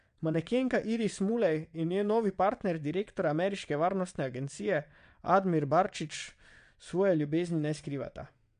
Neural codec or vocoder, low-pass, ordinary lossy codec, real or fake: vocoder, 22.05 kHz, 80 mel bands, WaveNeXt; 9.9 kHz; MP3, 64 kbps; fake